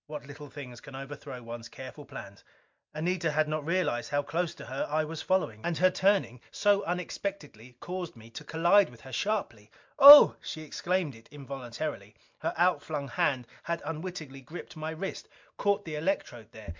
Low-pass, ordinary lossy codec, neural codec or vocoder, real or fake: 7.2 kHz; MP3, 64 kbps; none; real